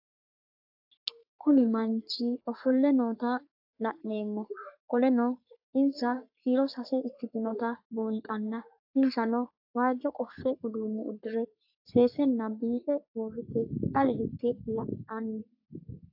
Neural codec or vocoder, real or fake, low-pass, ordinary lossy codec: codec, 44.1 kHz, 3.4 kbps, Pupu-Codec; fake; 5.4 kHz; AAC, 48 kbps